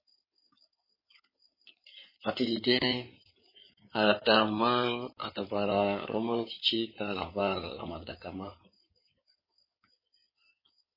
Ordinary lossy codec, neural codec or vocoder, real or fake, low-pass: MP3, 24 kbps; codec, 16 kHz in and 24 kHz out, 2.2 kbps, FireRedTTS-2 codec; fake; 5.4 kHz